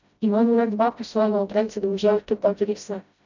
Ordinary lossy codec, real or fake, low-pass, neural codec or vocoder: none; fake; 7.2 kHz; codec, 16 kHz, 0.5 kbps, FreqCodec, smaller model